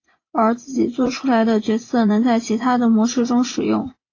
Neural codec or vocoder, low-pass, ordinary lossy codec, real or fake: vocoder, 24 kHz, 100 mel bands, Vocos; 7.2 kHz; AAC, 32 kbps; fake